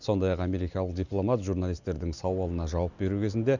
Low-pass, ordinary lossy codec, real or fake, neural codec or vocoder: 7.2 kHz; none; real; none